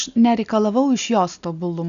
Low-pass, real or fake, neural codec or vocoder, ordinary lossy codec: 7.2 kHz; real; none; AAC, 96 kbps